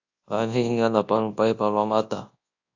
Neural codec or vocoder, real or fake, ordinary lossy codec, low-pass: codec, 24 kHz, 0.9 kbps, WavTokenizer, large speech release; fake; AAC, 48 kbps; 7.2 kHz